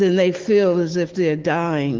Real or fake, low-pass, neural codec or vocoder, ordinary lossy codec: real; 7.2 kHz; none; Opus, 16 kbps